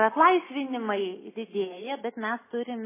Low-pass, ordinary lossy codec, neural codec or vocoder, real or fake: 3.6 kHz; MP3, 16 kbps; none; real